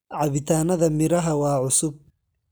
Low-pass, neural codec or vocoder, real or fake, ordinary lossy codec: none; none; real; none